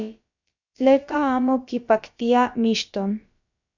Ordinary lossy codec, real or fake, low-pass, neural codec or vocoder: MP3, 64 kbps; fake; 7.2 kHz; codec, 16 kHz, about 1 kbps, DyCAST, with the encoder's durations